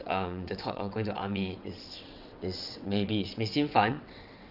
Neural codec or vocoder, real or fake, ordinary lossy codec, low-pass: vocoder, 22.05 kHz, 80 mel bands, WaveNeXt; fake; none; 5.4 kHz